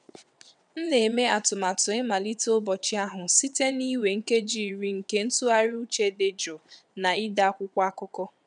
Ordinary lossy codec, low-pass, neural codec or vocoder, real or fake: none; 9.9 kHz; vocoder, 22.05 kHz, 80 mel bands, WaveNeXt; fake